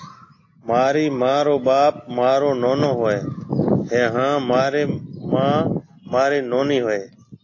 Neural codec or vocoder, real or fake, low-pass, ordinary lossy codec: none; real; 7.2 kHz; AAC, 32 kbps